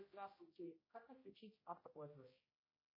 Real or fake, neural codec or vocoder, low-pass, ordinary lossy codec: fake; codec, 16 kHz, 0.5 kbps, X-Codec, HuBERT features, trained on general audio; 5.4 kHz; MP3, 24 kbps